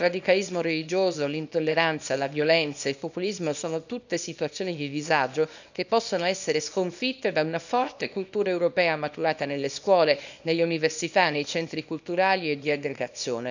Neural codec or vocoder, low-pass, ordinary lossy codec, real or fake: codec, 24 kHz, 0.9 kbps, WavTokenizer, small release; 7.2 kHz; none; fake